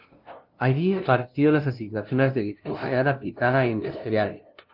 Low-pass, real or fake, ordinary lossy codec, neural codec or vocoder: 5.4 kHz; fake; Opus, 24 kbps; codec, 16 kHz, 0.5 kbps, FunCodec, trained on LibriTTS, 25 frames a second